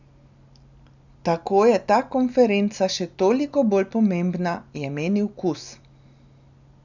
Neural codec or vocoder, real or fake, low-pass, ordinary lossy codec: none; real; 7.2 kHz; none